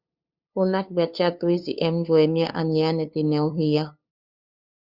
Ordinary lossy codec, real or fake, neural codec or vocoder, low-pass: Opus, 64 kbps; fake; codec, 16 kHz, 2 kbps, FunCodec, trained on LibriTTS, 25 frames a second; 5.4 kHz